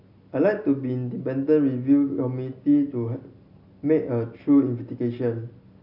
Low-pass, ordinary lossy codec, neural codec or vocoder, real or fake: 5.4 kHz; none; none; real